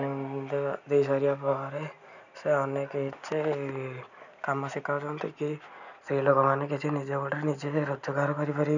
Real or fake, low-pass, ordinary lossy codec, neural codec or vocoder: real; 7.2 kHz; none; none